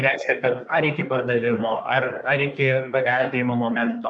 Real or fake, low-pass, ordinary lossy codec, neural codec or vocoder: fake; 9.9 kHz; MP3, 96 kbps; codec, 24 kHz, 1 kbps, SNAC